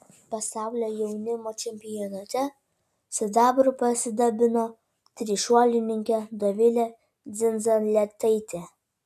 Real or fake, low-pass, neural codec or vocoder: real; 14.4 kHz; none